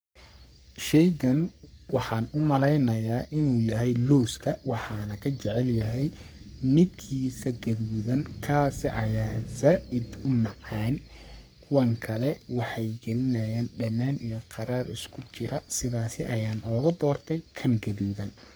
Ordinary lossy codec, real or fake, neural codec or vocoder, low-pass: none; fake; codec, 44.1 kHz, 3.4 kbps, Pupu-Codec; none